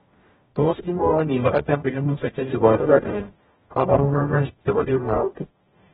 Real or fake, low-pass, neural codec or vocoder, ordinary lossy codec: fake; 19.8 kHz; codec, 44.1 kHz, 0.9 kbps, DAC; AAC, 16 kbps